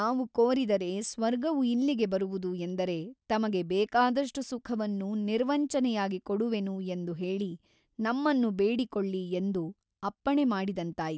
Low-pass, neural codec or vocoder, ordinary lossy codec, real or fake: none; none; none; real